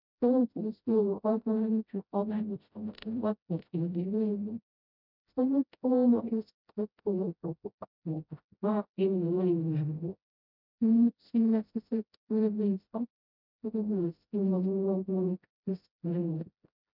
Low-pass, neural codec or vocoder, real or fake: 5.4 kHz; codec, 16 kHz, 0.5 kbps, FreqCodec, smaller model; fake